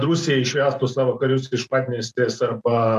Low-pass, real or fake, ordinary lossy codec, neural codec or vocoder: 14.4 kHz; real; MP3, 96 kbps; none